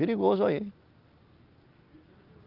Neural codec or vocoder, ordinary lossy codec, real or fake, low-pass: none; Opus, 24 kbps; real; 5.4 kHz